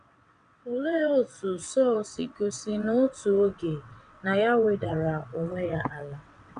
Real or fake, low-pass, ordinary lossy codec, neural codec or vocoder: fake; 9.9 kHz; none; vocoder, 22.05 kHz, 80 mel bands, WaveNeXt